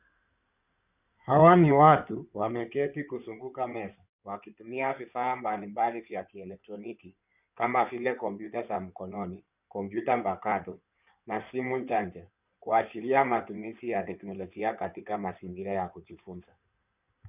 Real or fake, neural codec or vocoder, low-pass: fake; codec, 16 kHz in and 24 kHz out, 2.2 kbps, FireRedTTS-2 codec; 3.6 kHz